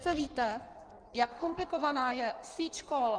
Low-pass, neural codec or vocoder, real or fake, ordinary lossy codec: 9.9 kHz; codec, 16 kHz in and 24 kHz out, 1.1 kbps, FireRedTTS-2 codec; fake; Opus, 32 kbps